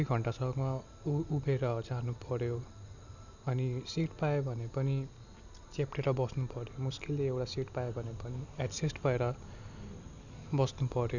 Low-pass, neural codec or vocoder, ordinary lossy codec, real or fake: 7.2 kHz; none; none; real